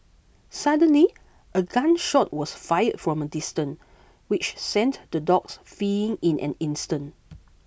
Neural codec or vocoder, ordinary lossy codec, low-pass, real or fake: none; none; none; real